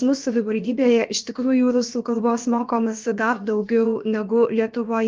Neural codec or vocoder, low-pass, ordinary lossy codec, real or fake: codec, 16 kHz, about 1 kbps, DyCAST, with the encoder's durations; 7.2 kHz; Opus, 24 kbps; fake